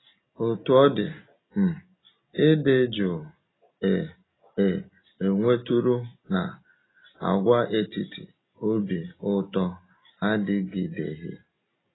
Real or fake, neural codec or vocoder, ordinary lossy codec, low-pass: real; none; AAC, 16 kbps; 7.2 kHz